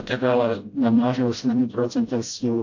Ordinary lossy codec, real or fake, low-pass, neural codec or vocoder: AAC, 48 kbps; fake; 7.2 kHz; codec, 16 kHz, 0.5 kbps, FreqCodec, smaller model